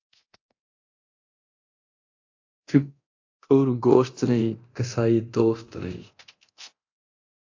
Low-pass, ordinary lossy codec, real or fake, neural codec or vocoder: 7.2 kHz; AAC, 48 kbps; fake; codec, 24 kHz, 0.9 kbps, DualCodec